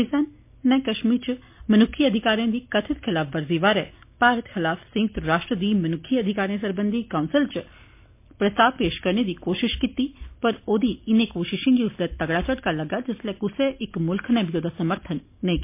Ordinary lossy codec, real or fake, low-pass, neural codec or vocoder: MP3, 24 kbps; real; 3.6 kHz; none